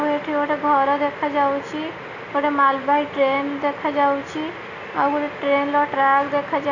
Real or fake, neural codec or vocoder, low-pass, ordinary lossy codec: real; none; 7.2 kHz; none